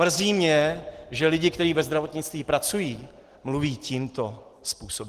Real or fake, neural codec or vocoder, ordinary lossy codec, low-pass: real; none; Opus, 16 kbps; 14.4 kHz